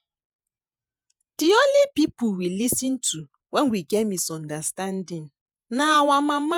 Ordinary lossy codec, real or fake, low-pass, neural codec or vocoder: none; fake; none; vocoder, 48 kHz, 128 mel bands, Vocos